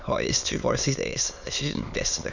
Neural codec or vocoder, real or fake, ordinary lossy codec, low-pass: autoencoder, 22.05 kHz, a latent of 192 numbers a frame, VITS, trained on many speakers; fake; none; 7.2 kHz